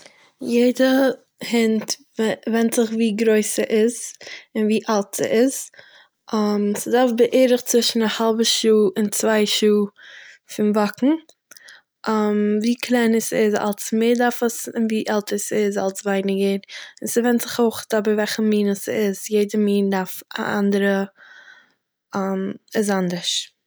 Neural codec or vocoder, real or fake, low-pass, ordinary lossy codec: none; real; none; none